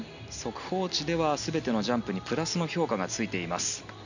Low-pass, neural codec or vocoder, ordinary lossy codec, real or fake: 7.2 kHz; none; none; real